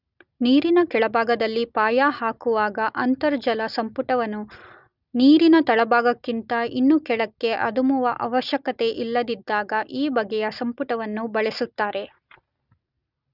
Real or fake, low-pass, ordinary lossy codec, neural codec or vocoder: real; 5.4 kHz; none; none